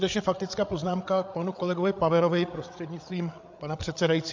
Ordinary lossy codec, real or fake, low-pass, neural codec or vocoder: MP3, 64 kbps; fake; 7.2 kHz; codec, 16 kHz, 8 kbps, FreqCodec, larger model